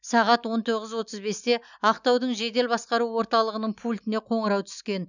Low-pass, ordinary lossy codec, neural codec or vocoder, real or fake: 7.2 kHz; none; none; real